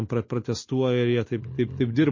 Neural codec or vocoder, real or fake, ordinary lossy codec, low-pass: none; real; MP3, 32 kbps; 7.2 kHz